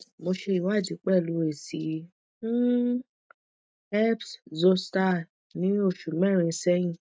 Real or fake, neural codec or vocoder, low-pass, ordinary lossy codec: real; none; none; none